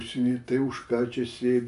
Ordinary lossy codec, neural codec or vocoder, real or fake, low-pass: AAC, 96 kbps; none; real; 10.8 kHz